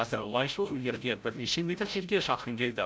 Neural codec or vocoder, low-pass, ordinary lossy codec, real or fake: codec, 16 kHz, 0.5 kbps, FreqCodec, larger model; none; none; fake